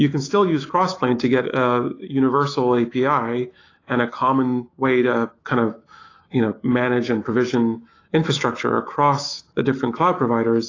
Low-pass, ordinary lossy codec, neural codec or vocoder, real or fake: 7.2 kHz; AAC, 32 kbps; autoencoder, 48 kHz, 128 numbers a frame, DAC-VAE, trained on Japanese speech; fake